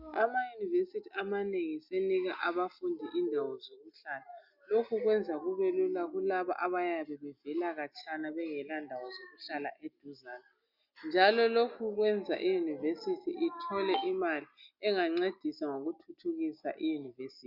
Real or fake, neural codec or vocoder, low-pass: real; none; 5.4 kHz